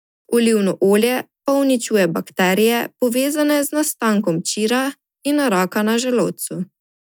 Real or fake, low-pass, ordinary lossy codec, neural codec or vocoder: real; none; none; none